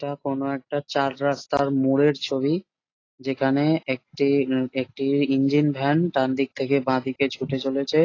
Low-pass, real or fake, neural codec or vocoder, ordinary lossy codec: 7.2 kHz; real; none; AAC, 32 kbps